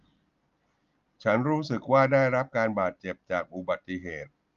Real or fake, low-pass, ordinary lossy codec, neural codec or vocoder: real; 7.2 kHz; Opus, 32 kbps; none